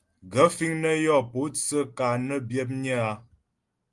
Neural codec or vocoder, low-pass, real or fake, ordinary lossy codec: none; 10.8 kHz; real; Opus, 24 kbps